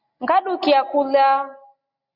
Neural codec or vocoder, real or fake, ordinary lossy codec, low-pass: none; real; Opus, 64 kbps; 5.4 kHz